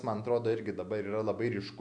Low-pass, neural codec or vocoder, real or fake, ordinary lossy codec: 9.9 kHz; none; real; MP3, 64 kbps